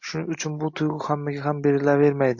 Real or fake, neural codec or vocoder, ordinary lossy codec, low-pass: real; none; MP3, 48 kbps; 7.2 kHz